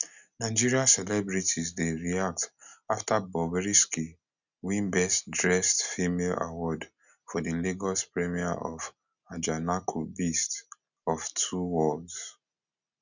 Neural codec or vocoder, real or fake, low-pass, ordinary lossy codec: none; real; 7.2 kHz; none